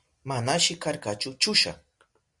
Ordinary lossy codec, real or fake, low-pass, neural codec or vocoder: Opus, 64 kbps; real; 10.8 kHz; none